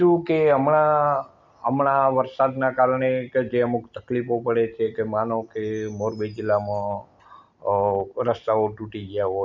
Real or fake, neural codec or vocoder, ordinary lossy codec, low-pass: real; none; none; 7.2 kHz